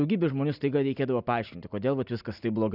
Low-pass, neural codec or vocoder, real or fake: 5.4 kHz; none; real